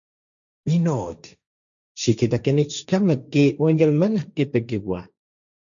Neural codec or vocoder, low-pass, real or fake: codec, 16 kHz, 1.1 kbps, Voila-Tokenizer; 7.2 kHz; fake